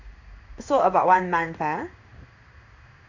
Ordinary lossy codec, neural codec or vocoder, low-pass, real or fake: none; codec, 16 kHz in and 24 kHz out, 1 kbps, XY-Tokenizer; 7.2 kHz; fake